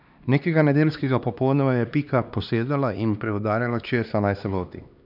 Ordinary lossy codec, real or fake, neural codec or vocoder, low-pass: none; fake; codec, 16 kHz, 2 kbps, X-Codec, HuBERT features, trained on LibriSpeech; 5.4 kHz